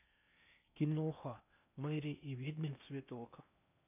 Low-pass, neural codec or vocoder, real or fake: 3.6 kHz; codec, 16 kHz in and 24 kHz out, 0.8 kbps, FocalCodec, streaming, 65536 codes; fake